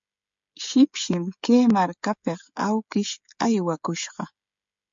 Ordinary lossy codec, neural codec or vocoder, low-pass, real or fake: MP3, 48 kbps; codec, 16 kHz, 16 kbps, FreqCodec, smaller model; 7.2 kHz; fake